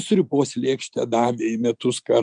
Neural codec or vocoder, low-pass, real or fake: none; 9.9 kHz; real